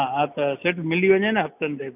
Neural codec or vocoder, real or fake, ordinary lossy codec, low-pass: none; real; none; 3.6 kHz